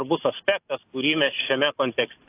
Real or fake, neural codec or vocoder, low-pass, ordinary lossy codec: real; none; 3.6 kHz; AAC, 32 kbps